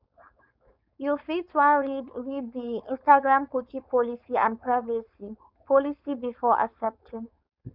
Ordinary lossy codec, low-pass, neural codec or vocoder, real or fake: none; 5.4 kHz; codec, 16 kHz, 4.8 kbps, FACodec; fake